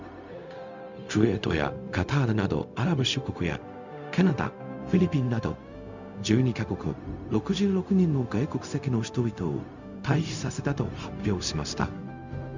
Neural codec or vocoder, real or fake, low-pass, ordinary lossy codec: codec, 16 kHz, 0.4 kbps, LongCat-Audio-Codec; fake; 7.2 kHz; none